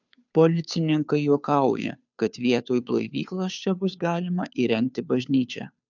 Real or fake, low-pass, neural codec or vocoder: fake; 7.2 kHz; codec, 16 kHz, 2 kbps, FunCodec, trained on Chinese and English, 25 frames a second